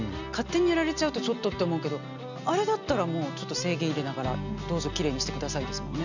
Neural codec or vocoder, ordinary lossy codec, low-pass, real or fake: none; none; 7.2 kHz; real